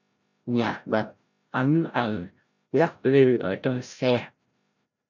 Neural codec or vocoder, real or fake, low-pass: codec, 16 kHz, 0.5 kbps, FreqCodec, larger model; fake; 7.2 kHz